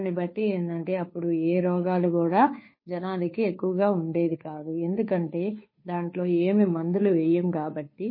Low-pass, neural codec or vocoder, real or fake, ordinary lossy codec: 5.4 kHz; codec, 16 kHz, 2 kbps, FunCodec, trained on Chinese and English, 25 frames a second; fake; MP3, 24 kbps